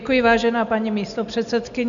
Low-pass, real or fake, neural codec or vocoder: 7.2 kHz; real; none